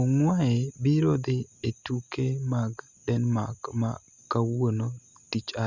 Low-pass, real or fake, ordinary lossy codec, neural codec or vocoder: 7.2 kHz; real; Opus, 64 kbps; none